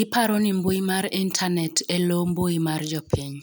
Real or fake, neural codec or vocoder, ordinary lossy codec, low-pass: real; none; none; none